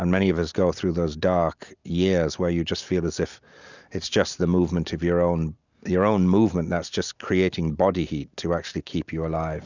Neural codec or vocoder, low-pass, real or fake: none; 7.2 kHz; real